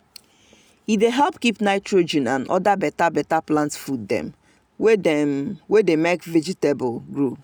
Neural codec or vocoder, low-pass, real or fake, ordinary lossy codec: none; none; real; none